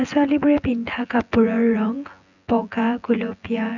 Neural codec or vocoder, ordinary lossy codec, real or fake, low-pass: vocoder, 24 kHz, 100 mel bands, Vocos; none; fake; 7.2 kHz